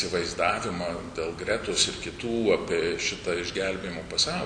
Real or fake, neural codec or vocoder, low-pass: real; none; 9.9 kHz